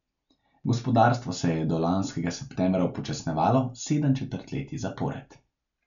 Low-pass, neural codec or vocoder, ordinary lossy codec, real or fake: 7.2 kHz; none; none; real